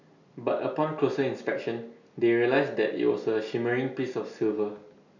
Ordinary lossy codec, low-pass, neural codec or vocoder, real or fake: none; 7.2 kHz; none; real